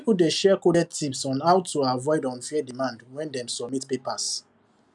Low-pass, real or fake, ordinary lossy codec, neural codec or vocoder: 10.8 kHz; real; none; none